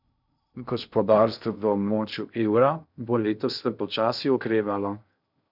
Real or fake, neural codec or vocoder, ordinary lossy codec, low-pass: fake; codec, 16 kHz in and 24 kHz out, 0.6 kbps, FocalCodec, streaming, 2048 codes; none; 5.4 kHz